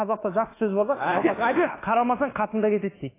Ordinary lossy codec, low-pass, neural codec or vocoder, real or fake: AAC, 16 kbps; 3.6 kHz; codec, 24 kHz, 1.2 kbps, DualCodec; fake